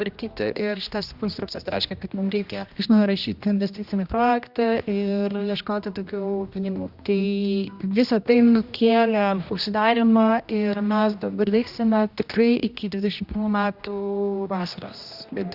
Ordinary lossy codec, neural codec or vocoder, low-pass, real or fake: Opus, 64 kbps; codec, 16 kHz, 1 kbps, X-Codec, HuBERT features, trained on general audio; 5.4 kHz; fake